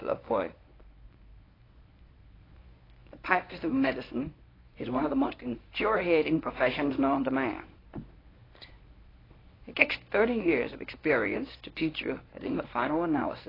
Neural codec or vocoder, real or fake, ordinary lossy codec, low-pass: codec, 24 kHz, 0.9 kbps, WavTokenizer, medium speech release version 1; fake; AAC, 24 kbps; 5.4 kHz